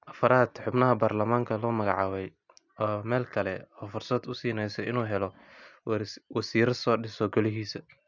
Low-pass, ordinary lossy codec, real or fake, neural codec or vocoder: 7.2 kHz; none; real; none